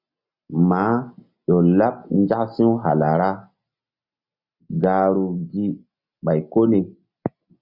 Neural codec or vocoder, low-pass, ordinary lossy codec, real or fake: none; 5.4 kHz; Opus, 64 kbps; real